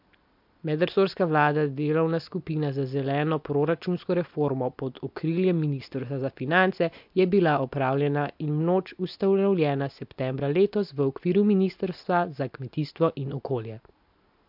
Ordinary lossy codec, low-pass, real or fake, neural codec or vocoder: MP3, 48 kbps; 5.4 kHz; real; none